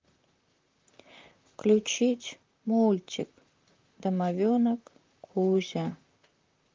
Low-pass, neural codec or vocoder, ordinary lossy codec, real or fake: 7.2 kHz; vocoder, 44.1 kHz, 128 mel bands, Pupu-Vocoder; Opus, 24 kbps; fake